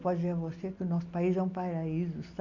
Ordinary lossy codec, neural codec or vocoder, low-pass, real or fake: none; none; 7.2 kHz; real